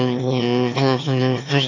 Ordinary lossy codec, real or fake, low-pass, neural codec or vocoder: AAC, 48 kbps; fake; 7.2 kHz; autoencoder, 22.05 kHz, a latent of 192 numbers a frame, VITS, trained on one speaker